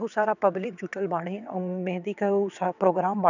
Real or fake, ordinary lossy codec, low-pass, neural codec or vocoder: fake; none; 7.2 kHz; vocoder, 22.05 kHz, 80 mel bands, WaveNeXt